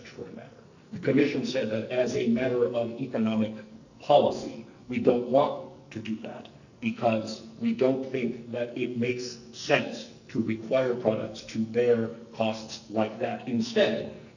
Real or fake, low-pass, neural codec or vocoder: fake; 7.2 kHz; codec, 44.1 kHz, 2.6 kbps, SNAC